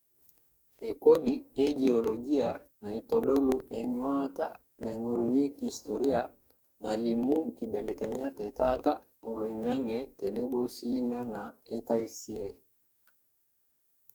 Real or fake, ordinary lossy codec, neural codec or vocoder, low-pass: fake; none; codec, 44.1 kHz, 2.6 kbps, DAC; none